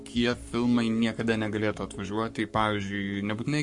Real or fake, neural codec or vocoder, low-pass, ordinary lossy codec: fake; codec, 44.1 kHz, 7.8 kbps, Pupu-Codec; 10.8 kHz; MP3, 48 kbps